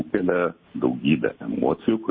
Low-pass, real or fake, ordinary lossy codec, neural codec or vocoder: 7.2 kHz; real; MP3, 24 kbps; none